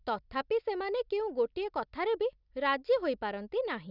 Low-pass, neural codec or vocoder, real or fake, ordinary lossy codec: 5.4 kHz; none; real; none